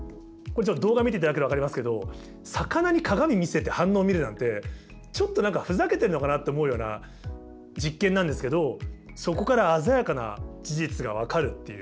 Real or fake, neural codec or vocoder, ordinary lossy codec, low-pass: real; none; none; none